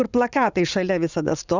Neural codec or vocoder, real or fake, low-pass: vocoder, 44.1 kHz, 80 mel bands, Vocos; fake; 7.2 kHz